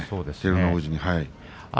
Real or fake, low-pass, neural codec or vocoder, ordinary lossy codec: real; none; none; none